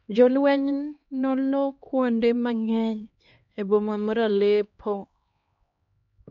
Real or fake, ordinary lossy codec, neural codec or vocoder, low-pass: fake; MP3, 48 kbps; codec, 16 kHz, 2 kbps, X-Codec, HuBERT features, trained on LibriSpeech; 7.2 kHz